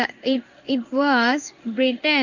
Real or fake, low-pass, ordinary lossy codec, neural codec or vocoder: fake; 7.2 kHz; none; codec, 16 kHz in and 24 kHz out, 1 kbps, XY-Tokenizer